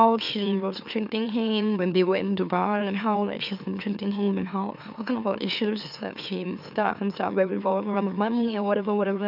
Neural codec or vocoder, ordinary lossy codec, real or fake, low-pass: autoencoder, 44.1 kHz, a latent of 192 numbers a frame, MeloTTS; none; fake; 5.4 kHz